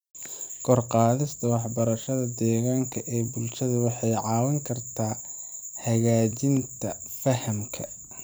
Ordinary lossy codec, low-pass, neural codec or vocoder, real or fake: none; none; vocoder, 44.1 kHz, 128 mel bands every 256 samples, BigVGAN v2; fake